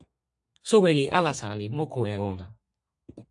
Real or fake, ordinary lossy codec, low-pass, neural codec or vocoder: fake; AAC, 64 kbps; 10.8 kHz; codec, 32 kHz, 1.9 kbps, SNAC